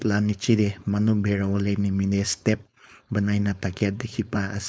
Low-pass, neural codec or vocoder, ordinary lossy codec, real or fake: none; codec, 16 kHz, 4.8 kbps, FACodec; none; fake